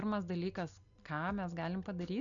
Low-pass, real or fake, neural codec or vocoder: 7.2 kHz; real; none